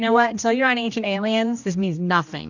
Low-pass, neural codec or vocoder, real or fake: 7.2 kHz; codec, 16 kHz, 1 kbps, X-Codec, HuBERT features, trained on general audio; fake